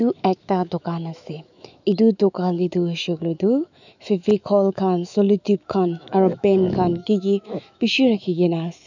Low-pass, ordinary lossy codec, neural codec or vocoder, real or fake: 7.2 kHz; none; autoencoder, 48 kHz, 128 numbers a frame, DAC-VAE, trained on Japanese speech; fake